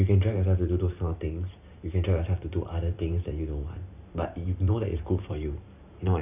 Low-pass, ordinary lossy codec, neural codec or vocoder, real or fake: 3.6 kHz; none; vocoder, 44.1 kHz, 128 mel bands every 512 samples, BigVGAN v2; fake